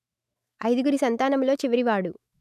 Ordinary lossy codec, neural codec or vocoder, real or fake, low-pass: none; autoencoder, 48 kHz, 128 numbers a frame, DAC-VAE, trained on Japanese speech; fake; 14.4 kHz